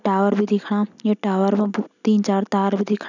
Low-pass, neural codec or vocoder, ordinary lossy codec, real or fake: 7.2 kHz; none; none; real